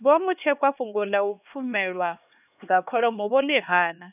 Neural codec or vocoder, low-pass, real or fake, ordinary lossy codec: codec, 16 kHz, 2 kbps, X-Codec, HuBERT features, trained on LibriSpeech; 3.6 kHz; fake; none